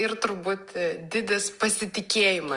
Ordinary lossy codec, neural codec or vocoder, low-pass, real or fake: Opus, 32 kbps; none; 10.8 kHz; real